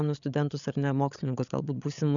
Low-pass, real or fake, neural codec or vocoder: 7.2 kHz; fake; codec, 16 kHz, 8 kbps, FreqCodec, larger model